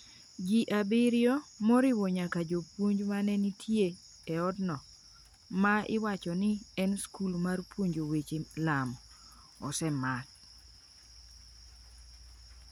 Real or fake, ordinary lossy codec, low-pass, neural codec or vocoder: real; none; 19.8 kHz; none